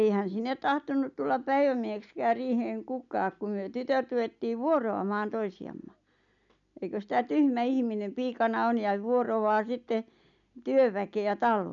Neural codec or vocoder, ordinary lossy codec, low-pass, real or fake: none; none; 7.2 kHz; real